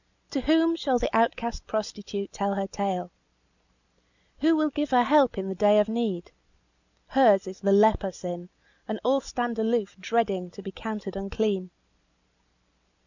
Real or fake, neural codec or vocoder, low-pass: real; none; 7.2 kHz